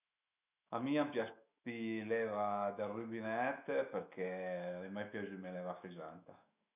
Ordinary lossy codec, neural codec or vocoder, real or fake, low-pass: none; autoencoder, 48 kHz, 128 numbers a frame, DAC-VAE, trained on Japanese speech; fake; 3.6 kHz